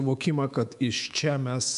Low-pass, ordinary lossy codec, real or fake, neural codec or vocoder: 10.8 kHz; AAC, 96 kbps; fake; codec, 24 kHz, 3.1 kbps, DualCodec